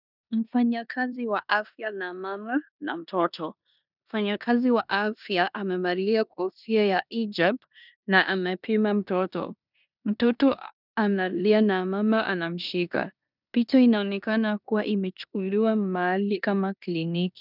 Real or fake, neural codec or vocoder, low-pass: fake; codec, 16 kHz in and 24 kHz out, 0.9 kbps, LongCat-Audio-Codec, four codebook decoder; 5.4 kHz